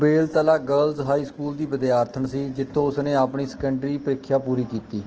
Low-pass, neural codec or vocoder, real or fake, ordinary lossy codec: 7.2 kHz; autoencoder, 48 kHz, 128 numbers a frame, DAC-VAE, trained on Japanese speech; fake; Opus, 16 kbps